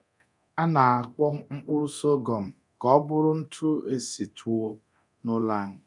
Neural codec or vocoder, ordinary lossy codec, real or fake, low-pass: codec, 24 kHz, 0.9 kbps, DualCodec; none; fake; 10.8 kHz